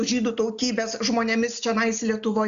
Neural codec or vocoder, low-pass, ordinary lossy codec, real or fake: none; 7.2 kHz; AAC, 96 kbps; real